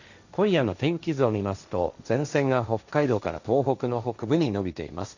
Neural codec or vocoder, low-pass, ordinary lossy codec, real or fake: codec, 16 kHz, 1.1 kbps, Voila-Tokenizer; 7.2 kHz; none; fake